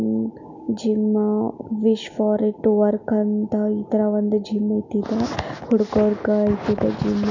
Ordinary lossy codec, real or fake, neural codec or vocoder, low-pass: none; real; none; 7.2 kHz